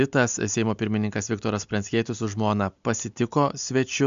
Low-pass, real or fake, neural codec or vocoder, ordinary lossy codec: 7.2 kHz; real; none; MP3, 64 kbps